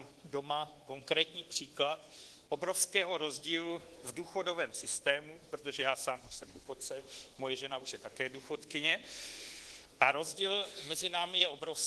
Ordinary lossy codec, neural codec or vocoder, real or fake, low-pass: Opus, 16 kbps; codec, 24 kHz, 1.2 kbps, DualCodec; fake; 10.8 kHz